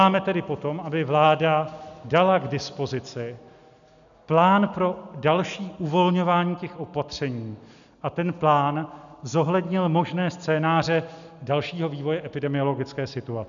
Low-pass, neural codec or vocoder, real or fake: 7.2 kHz; none; real